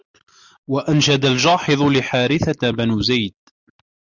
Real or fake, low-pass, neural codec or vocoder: real; 7.2 kHz; none